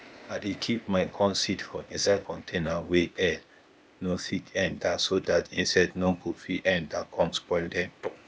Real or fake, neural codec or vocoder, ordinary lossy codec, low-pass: fake; codec, 16 kHz, 0.8 kbps, ZipCodec; none; none